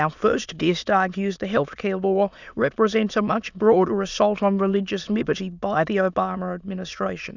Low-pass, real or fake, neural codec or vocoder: 7.2 kHz; fake; autoencoder, 22.05 kHz, a latent of 192 numbers a frame, VITS, trained on many speakers